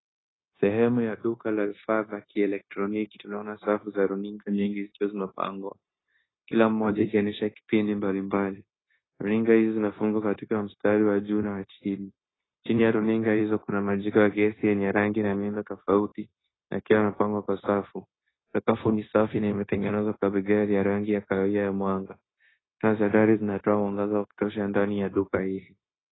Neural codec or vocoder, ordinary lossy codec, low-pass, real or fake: codec, 16 kHz, 0.9 kbps, LongCat-Audio-Codec; AAC, 16 kbps; 7.2 kHz; fake